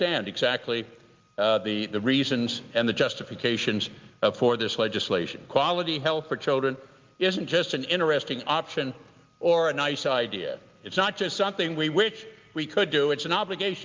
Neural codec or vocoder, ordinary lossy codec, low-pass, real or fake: none; Opus, 32 kbps; 7.2 kHz; real